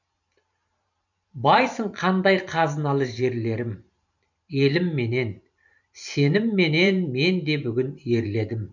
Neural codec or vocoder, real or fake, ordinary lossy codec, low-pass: vocoder, 44.1 kHz, 128 mel bands every 512 samples, BigVGAN v2; fake; none; 7.2 kHz